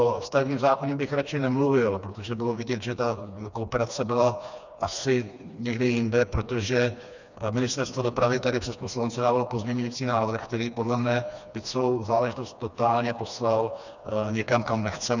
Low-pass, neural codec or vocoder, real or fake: 7.2 kHz; codec, 16 kHz, 2 kbps, FreqCodec, smaller model; fake